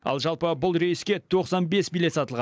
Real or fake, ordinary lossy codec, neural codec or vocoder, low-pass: real; none; none; none